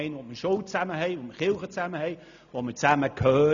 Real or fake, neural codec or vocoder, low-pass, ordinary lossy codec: real; none; 7.2 kHz; none